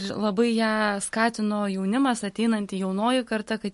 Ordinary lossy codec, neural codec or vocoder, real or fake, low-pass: MP3, 48 kbps; none; real; 14.4 kHz